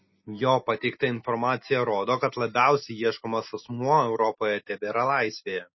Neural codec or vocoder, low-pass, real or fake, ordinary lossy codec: none; 7.2 kHz; real; MP3, 24 kbps